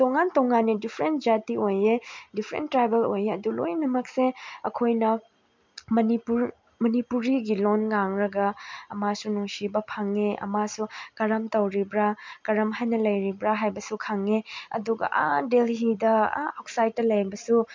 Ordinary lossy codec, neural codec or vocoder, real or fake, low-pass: MP3, 64 kbps; none; real; 7.2 kHz